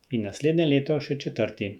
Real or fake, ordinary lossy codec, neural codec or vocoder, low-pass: fake; none; autoencoder, 48 kHz, 128 numbers a frame, DAC-VAE, trained on Japanese speech; 19.8 kHz